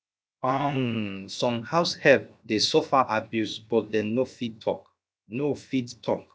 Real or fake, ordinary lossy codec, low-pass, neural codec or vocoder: fake; none; none; codec, 16 kHz, 0.7 kbps, FocalCodec